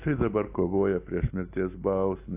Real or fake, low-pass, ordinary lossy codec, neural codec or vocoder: real; 3.6 kHz; AAC, 24 kbps; none